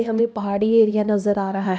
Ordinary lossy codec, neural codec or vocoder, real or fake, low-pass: none; codec, 16 kHz, 1 kbps, X-Codec, HuBERT features, trained on LibriSpeech; fake; none